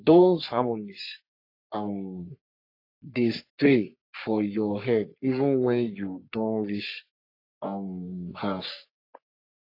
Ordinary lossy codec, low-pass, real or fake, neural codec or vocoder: AAC, 32 kbps; 5.4 kHz; fake; codec, 44.1 kHz, 3.4 kbps, Pupu-Codec